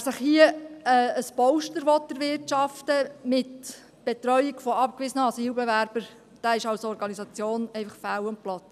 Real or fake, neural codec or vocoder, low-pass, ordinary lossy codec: real; none; 14.4 kHz; none